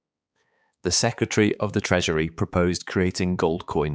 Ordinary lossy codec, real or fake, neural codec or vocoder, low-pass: none; fake; codec, 16 kHz, 4 kbps, X-Codec, HuBERT features, trained on balanced general audio; none